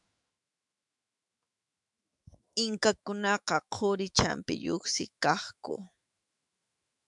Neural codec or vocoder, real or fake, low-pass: autoencoder, 48 kHz, 128 numbers a frame, DAC-VAE, trained on Japanese speech; fake; 10.8 kHz